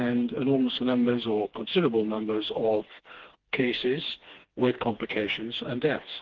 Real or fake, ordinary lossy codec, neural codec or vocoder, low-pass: fake; Opus, 16 kbps; codec, 16 kHz, 2 kbps, FreqCodec, smaller model; 7.2 kHz